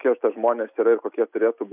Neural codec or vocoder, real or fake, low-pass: none; real; 3.6 kHz